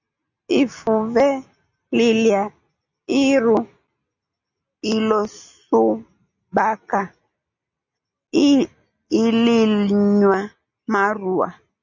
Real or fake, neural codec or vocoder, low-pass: real; none; 7.2 kHz